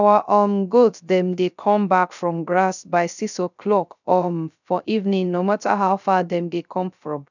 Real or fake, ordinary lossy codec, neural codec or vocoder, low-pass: fake; none; codec, 16 kHz, 0.3 kbps, FocalCodec; 7.2 kHz